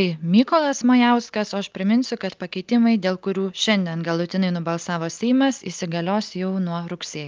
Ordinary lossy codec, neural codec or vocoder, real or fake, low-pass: Opus, 24 kbps; none; real; 7.2 kHz